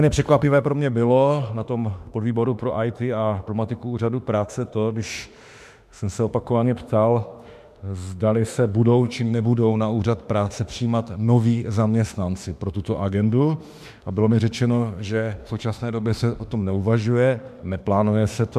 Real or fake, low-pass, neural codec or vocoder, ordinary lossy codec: fake; 14.4 kHz; autoencoder, 48 kHz, 32 numbers a frame, DAC-VAE, trained on Japanese speech; MP3, 96 kbps